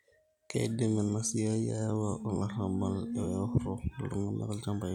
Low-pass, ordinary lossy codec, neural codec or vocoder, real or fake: 19.8 kHz; none; none; real